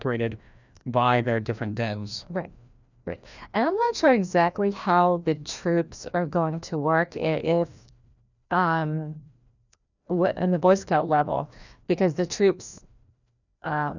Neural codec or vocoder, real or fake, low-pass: codec, 16 kHz, 1 kbps, FreqCodec, larger model; fake; 7.2 kHz